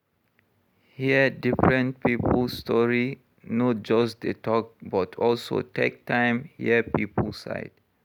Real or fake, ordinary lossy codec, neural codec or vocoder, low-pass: real; none; none; 19.8 kHz